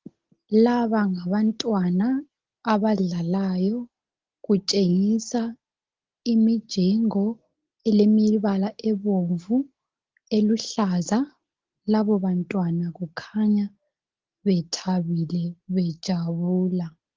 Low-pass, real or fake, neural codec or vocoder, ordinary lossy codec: 7.2 kHz; real; none; Opus, 16 kbps